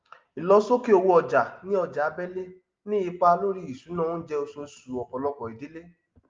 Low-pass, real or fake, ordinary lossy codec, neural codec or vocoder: 7.2 kHz; real; Opus, 32 kbps; none